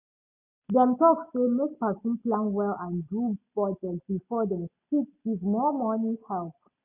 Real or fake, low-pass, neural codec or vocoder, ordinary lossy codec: real; 3.6 kHz; none; none